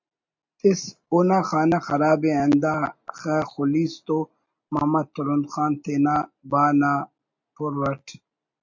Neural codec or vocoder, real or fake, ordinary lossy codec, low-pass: none; real; MP3, 48 kbps; 7.2 kHz